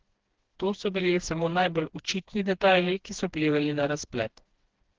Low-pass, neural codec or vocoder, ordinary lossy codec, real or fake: 7.2 kHz; codec, 16 kHz, 2 kbps, FreqCodec, smaller model; Opus, 16 kbps; fake